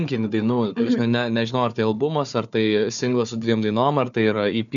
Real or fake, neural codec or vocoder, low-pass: fake; codec, 16 kHz, 4 kbps, FunCodec, trained on Chinese and English, 50 frames a second; 7.2 kHz